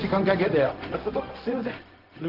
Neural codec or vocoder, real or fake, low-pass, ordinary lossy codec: codec, 16 kHz, 0.4 kbps, LongCat-Audio-Codec; fake; 5.4 kHz; Opus, 16 kbps